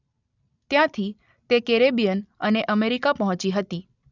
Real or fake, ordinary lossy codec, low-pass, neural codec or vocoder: real; none; 7.2 kHz; none